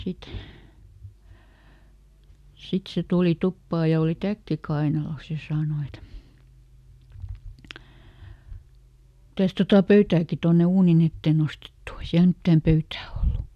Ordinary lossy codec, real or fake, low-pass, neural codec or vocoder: none; real; 14.4 kHz; none